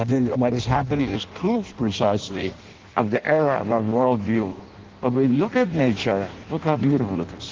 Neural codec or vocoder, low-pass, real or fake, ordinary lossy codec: codec, 16 kHz in and 24 kHz out, 0.6 kbps, FireRedTTS-2 codec; 7.2 kHz; fake; Opus, 16 kbps